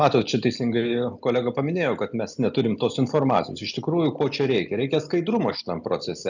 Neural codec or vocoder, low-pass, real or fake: none; 7.2 kHz; real